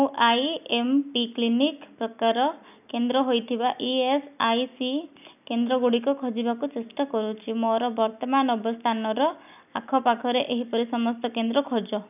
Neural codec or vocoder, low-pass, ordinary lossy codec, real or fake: none; 3.6 kHz; none; real